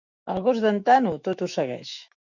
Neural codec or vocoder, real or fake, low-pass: autoencoder, 48 kHz, 128 numbers a frame, DAC-VAE, trained on Japanese speech; fake; 7.2 kHz